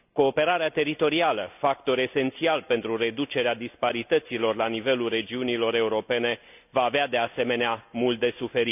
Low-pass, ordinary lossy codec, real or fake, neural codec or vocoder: 3.6 kHz; none; real; none